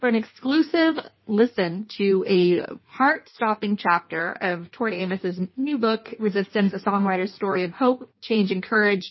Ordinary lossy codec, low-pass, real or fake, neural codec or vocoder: MP3, 24 kbps; 7.2 kHz; fake; codec, 16 kHz in and 24 kHz out, 1.1 kbps, FireRedTTS-2 codec